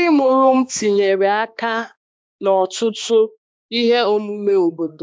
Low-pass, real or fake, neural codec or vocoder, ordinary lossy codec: none; fake; codec, 16 kHz, 2 kbps, X-Codec, HuBERT features, trained on balanced general audio; none